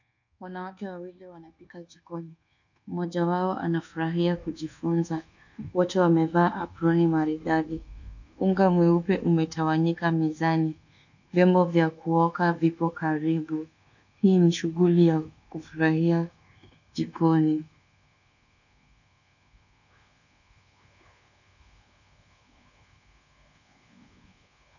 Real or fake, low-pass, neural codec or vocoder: fake; 7.2 kHz; codec, 24 kHz, 1.2 kbps, DualCodec